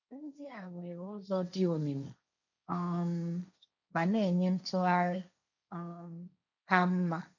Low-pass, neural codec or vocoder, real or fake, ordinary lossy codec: 7.2 kHz; codec, 16 kHz, 1.1 kbps, Voila-Tokenizer; fake; none